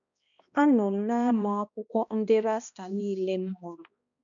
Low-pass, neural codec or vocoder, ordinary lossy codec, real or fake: 7.2 kHz; codec, 16 kHz, 1 kbps, X-Codec, HuBERT features, trained on balanced general audio; none; fake